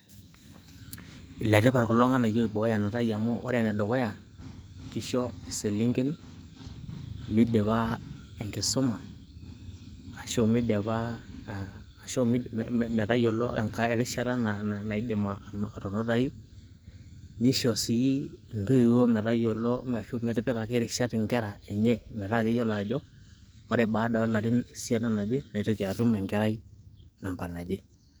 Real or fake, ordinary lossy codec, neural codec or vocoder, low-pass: fake; none; codec, 44.1 kHz, 2.6 kbps, SNAC; none